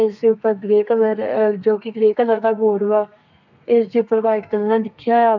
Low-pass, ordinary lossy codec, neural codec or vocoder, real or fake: 7.2 kHz; none; codec, 32 kHz, 1.9 kbps, SNAC; fake